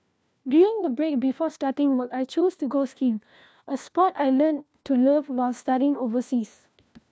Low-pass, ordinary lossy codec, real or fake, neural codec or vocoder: none; none; fake; codec, 16 kHz, 1 kbps, FunCodec, trained on LibriTTS, 50 frames a second